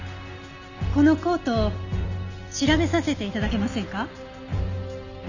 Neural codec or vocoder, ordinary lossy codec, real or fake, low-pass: none; none; real; 7.2 kHz